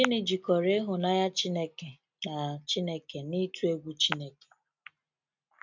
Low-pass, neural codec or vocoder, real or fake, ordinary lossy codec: 7.2 kHz; none; real; MP3, 64 kbps